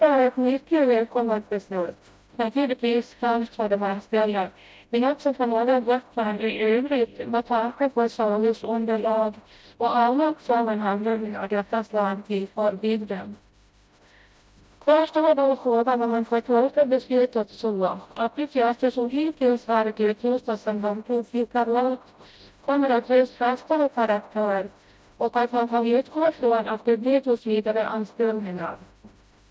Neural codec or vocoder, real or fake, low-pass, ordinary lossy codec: codec, 16 kHz, 0.5 kbps, FreqCodec, smaller model; fake; none; none